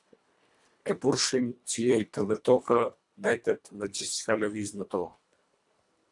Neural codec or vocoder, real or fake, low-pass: codec, 24 kHz, 1.5 kbps, HILCodec; fake; 10.8 kHz